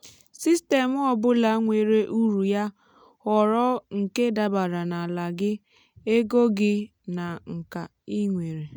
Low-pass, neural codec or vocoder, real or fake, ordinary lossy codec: 19.8 kHz; none; real; none